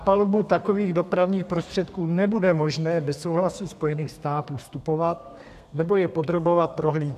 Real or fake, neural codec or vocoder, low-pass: fake; codec, 32 kHz, 1.9 kbps, SNAC; 14.4 kHz